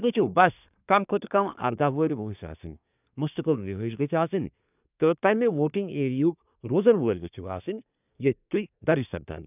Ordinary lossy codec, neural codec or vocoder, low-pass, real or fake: none; codec, 24 kHz, 1 kbps, SNAC; 3.6 kHz; fake